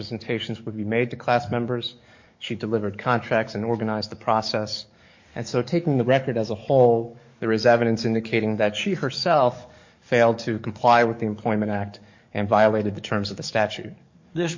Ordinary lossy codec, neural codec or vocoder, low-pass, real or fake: MP3, 48 kbps; codec, 44.1 kHz, 7.8 kbps, DAC; 7.2 kHz; fake